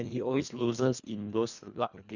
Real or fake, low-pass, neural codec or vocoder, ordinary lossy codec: fake; 7.2 kHz; codec, 24 kHz, 1.5 kbps, HILCodec; none